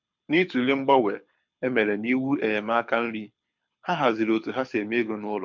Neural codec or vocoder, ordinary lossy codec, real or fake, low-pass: codec, 24 kHz, 6 kbps, HILCodec; MP3, 64 kbps; fake; 7.2 kHz